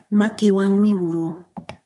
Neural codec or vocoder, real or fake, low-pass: codec, 24 kHz, 1 kbps, SNAC; fake; 10.8 kHz